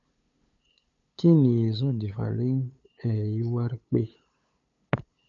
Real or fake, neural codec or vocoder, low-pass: fake; codec, 16 kHz, 8 kbps, FunCodec, trained on LibriTTS, 25 frames a second; 7.2 kHz